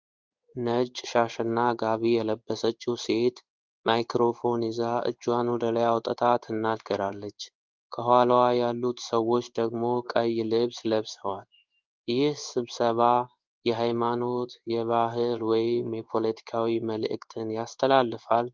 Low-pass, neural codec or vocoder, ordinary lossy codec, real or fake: 7.2 kHz; codec, 16 kHz in and 24 kHz out, 1 kbps, XY-Tokenizer; Opus, 32 kbps; fake